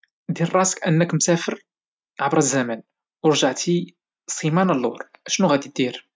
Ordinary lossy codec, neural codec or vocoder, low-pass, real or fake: none; none; none; real